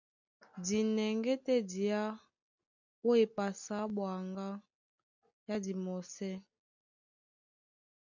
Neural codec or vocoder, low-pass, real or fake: none; 7.2 kHz; real